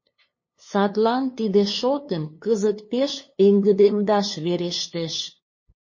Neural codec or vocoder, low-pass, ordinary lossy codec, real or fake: codec, 16 kHz, 2 kbps, FunCodec, trained on LibriTTS, 25 frames a second; 7.2 kHz; MP3, 32 kbps; fake